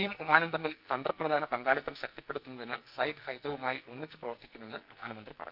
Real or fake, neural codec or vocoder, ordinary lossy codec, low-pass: fake; codec, 44.1 kHz, 2.6 kbps, SNAC; none; 5.4 kHz